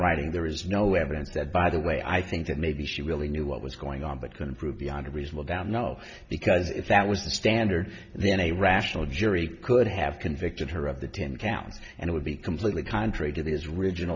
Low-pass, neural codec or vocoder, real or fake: 7.2 kHz; none; real